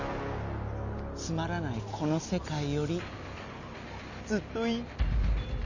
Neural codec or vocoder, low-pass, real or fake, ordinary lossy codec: none; 7.2 kHz; real; none